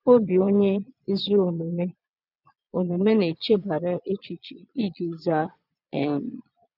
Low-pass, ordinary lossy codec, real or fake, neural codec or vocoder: 5.4 kHz; none; fake; vocoder, 22.05 kHz, 80 mel bands, Vocos